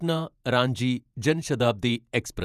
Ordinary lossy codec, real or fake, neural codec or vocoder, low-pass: none; fake; vocoder, 48 kHz, 128 mel bands, Vocos; 14.4 kHz